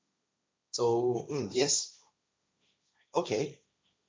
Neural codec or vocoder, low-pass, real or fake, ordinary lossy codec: codec, 16 kHz, 1.1 kbps, Voila-Tokenizer; none; fake; none